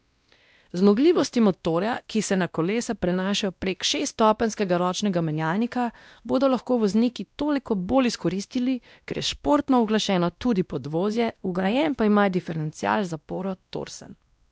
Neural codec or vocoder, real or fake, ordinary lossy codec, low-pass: codec, 16 kHz, 1 kbps, X-Codec, WavLM features, trained on Multilingual LibriSpeech; fake; none; none